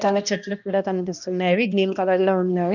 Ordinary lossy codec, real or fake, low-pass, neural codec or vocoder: none; fake; 7.2 kHz; codec, 16 kHz, 1 kbps, X-Codec, HuBERT features, trained on balanced general audio